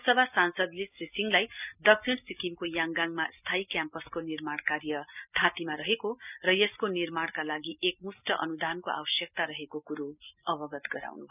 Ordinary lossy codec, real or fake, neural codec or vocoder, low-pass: none; real; none; 3.6 kHz